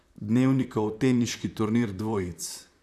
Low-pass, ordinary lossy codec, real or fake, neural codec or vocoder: 14.4 kHz; none; fake; vocoder, 44.1 kHz, 128 mel bands, Pupu-Vocoder